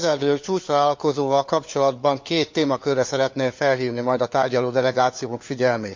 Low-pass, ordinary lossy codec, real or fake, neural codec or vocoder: 7.2 kHz; MP3, 64 kbps; fake; codec, 16 kHz, 4 kbps, FunCodec, trained on LibriTTS, 50 frames a second